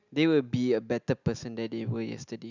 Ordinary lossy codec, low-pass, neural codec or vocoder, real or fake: none; 7.2 kHz; none; real